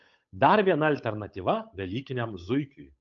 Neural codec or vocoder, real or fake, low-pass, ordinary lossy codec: codec, 16 kHz, 8 kbps, FunCodec, trained on Chinese and English, 25 frames a second; fake; 7.2 kHz; AAC, 64 kbps